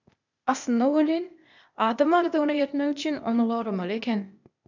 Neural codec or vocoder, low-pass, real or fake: codec, 16 kHz, 0.8 kbps, ZipCodec; 7.2 kHz; fake